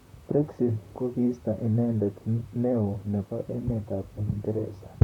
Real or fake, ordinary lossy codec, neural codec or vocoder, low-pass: fake; none; vocoder, 44.1 kHz, 128 mel bands, Pupu-Vocoder; 19.8 kHz